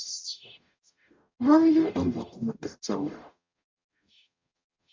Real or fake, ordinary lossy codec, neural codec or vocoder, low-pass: fake; MP3, 64 kbps; codec, 44.1 kHz, 0.9 kbps, DAC; 7.2 kHz